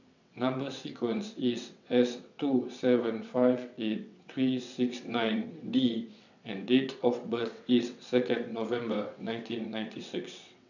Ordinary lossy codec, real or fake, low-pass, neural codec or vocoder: none; fake; 7.2 kHz; vocoder, 22.05 kHz, 80 mel bands, WaveNeXt